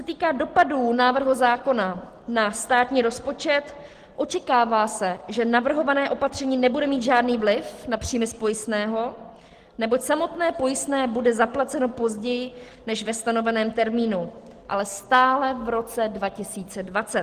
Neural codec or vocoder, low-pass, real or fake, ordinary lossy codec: none; 14.4 kHz; real; Opus, 16 kbps